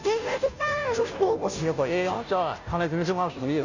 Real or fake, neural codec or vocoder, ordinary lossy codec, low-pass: fake; codec, 16 kHz, 0.5 kbps, FunCodec, trained on Chinese and English, 25 frames a second; none; 7.2 kHz